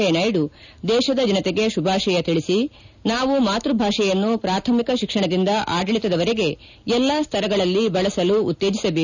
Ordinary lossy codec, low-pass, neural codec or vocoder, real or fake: none; 7.2 kHz; none; real